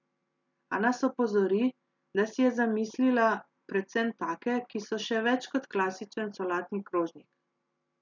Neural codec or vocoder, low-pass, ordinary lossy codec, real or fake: none; 7.2 kHz; none; real